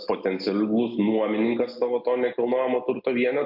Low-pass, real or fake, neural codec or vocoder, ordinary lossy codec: 5.4 kHz; real; none; AAC, 48 kbps